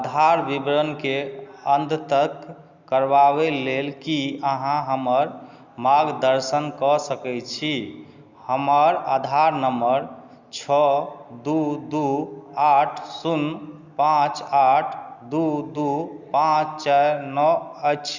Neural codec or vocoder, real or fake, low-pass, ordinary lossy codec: none; real; 7.2 kHz; Opus, 64 kbps